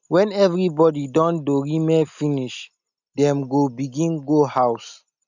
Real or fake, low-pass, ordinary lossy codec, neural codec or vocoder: real; 7.2 kHz; none; none